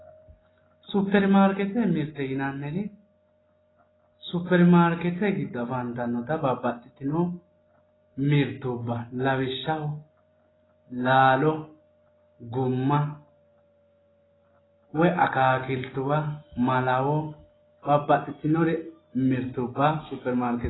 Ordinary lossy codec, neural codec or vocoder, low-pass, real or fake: AAC, 16 kbps; none; 7.2 kHz; real